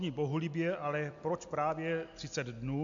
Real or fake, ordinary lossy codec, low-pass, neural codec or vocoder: real; MP3, 64 kbps; 7.2 kHz; none